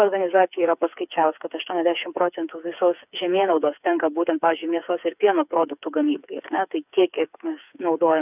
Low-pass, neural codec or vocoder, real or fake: 3.6 kHz; codec, 16 kHz, 4 kbps, FreqCodec, smaller model; fake